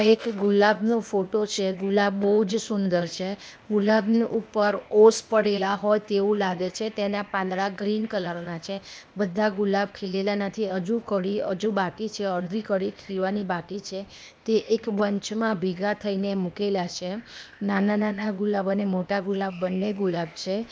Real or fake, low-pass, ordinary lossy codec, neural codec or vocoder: fake; none; none; codec, 16 kHz, 0.8 kbps, ZipCodec